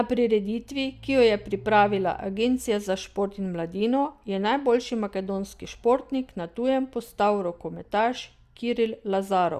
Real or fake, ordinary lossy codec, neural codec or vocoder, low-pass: real; Opus, 64 kbps; none; 14.4 kHz